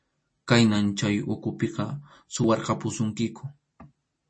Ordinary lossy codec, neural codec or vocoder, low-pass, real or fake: MP3, 32 kbps; none; 9.9 kHz; real